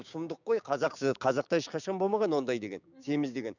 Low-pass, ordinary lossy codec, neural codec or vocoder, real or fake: 7.2 kHz; none; codec, 16 kHz, 6 kbps, DAC; fake